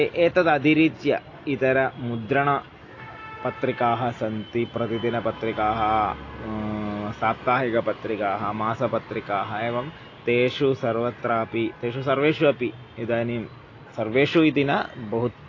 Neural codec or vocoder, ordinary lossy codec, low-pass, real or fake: none; AAC, 32 kbps; 7.2 kHz; real